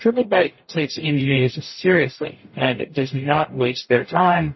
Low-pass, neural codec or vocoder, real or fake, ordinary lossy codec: 7.2 kHz; codec, 44.1 kHz, 0.9 kbps, DAC; fake; MP3, 24 kbps